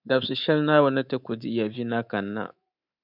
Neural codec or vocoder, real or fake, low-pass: codec, 24 kHz, 3.1 kbps, DualCodec; fake; 5.4 kHz